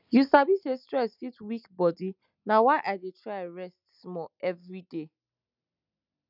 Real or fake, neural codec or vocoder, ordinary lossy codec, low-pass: real; none; none; 5.4 kHz